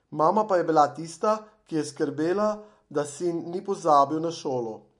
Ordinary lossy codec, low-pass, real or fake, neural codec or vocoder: MP3, 48 kbps; 10.8 kHz; real; none